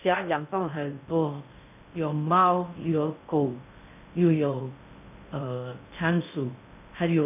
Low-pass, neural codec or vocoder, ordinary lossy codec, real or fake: 3.6 kHz; codec, 16 kHz in and 24 kHz out, 0.6 kbps, FocalCodec, streaming, 2048 codes; none; fake